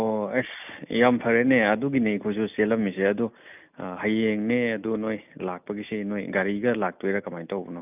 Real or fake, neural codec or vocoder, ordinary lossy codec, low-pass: real; none; none; 3.6 kHz